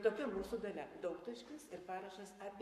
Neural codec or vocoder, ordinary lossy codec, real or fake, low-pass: codec, 44.1 kHz, 7.8 kbps, Pupu-Codec; MP3, 64 kbps; fake; 14.4 kHz